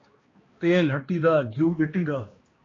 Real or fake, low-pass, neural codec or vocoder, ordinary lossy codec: fake; 7.2 kHz; codec, 16 kHz, 1 kbps, X-Codec, HuBERT features, trained on balanced general audio; AAC, 32 kbps